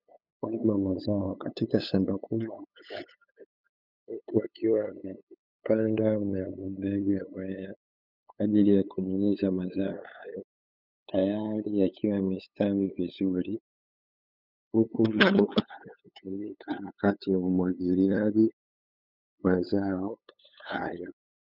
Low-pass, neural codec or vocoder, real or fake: 5.4 kHz; codec, 16 kHz, 8 kbps, FunCodec, trained on LibriTTS, 25 frames a second; fake